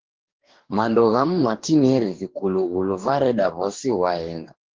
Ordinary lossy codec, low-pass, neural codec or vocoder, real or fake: Opus, 16 kbps; 7.2 kHz; codec, 44.1 kHz, 2.6 kbps, DAC; fake